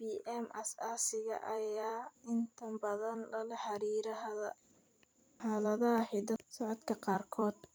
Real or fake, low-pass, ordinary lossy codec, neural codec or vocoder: fake; none; none; vocoder, 44.1 kHz, 128 mel bands every 256 samples, BigVGAN v2